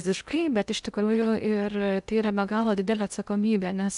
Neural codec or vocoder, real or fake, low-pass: codec, 16 kHz in and 24 kHz out, 0.6 kbps, FocalCodec, streaming, 2048 codes; fake; 10.8 kHz